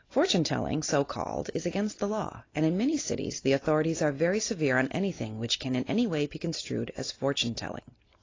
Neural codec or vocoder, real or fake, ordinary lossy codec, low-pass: none; real; AAC, 32 kbps; 7.2 kHz